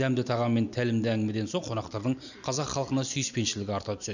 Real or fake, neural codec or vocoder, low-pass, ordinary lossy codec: real; none; 7.2 kHz; none